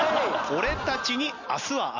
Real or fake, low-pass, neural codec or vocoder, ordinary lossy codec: real; 7.2 kHz; none; none